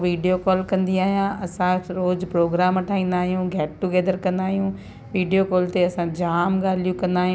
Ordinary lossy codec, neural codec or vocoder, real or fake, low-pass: none; none; real; none